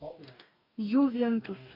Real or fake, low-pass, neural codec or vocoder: fake; 5.4 kHz; codec, 44.1 kHz, 2.6 kbps, DAC